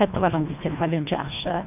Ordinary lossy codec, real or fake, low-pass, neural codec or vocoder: none; fake; 3.6 kHz; codec, 24 kHz, 1.5 kbps, HILCodec